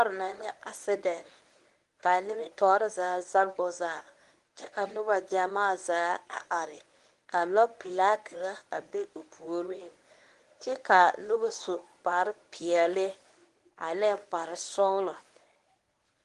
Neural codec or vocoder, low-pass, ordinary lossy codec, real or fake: codec, 24 kHz, 0.9 kbps, WavTokenizer, medium speech release version 1; 10.8 kHz; Opus, 24 kbps; fake